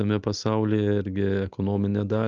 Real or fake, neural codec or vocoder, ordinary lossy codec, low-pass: real; none; Opus, 32 kbps; 7.2 kHz